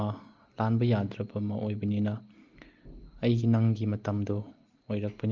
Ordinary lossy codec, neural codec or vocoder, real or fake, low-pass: Opus, 24 kbps; none; real; 7.2 kHz